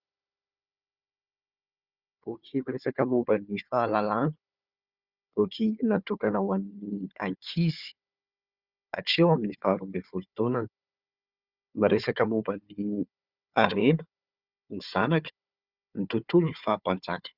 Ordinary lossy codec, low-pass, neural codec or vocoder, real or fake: Opus, 64 kbps; 5.4 kHz; codec, 16 kHz, 4 kbps, FunCodec, trained on Chinese and English, 50 frames a second; fake